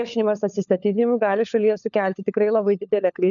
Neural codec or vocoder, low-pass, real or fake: codec, 16 kHz, 4 kbps, FunCodec, trained on LibriTTS, 50 frames a second; 7.2 kHz; fake